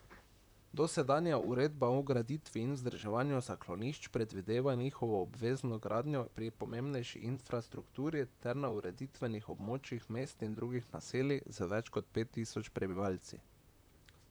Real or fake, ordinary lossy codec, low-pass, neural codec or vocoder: fake; none; none; vocoder, 44.1 kHz, 128 mel bands, Pupu-Vocoder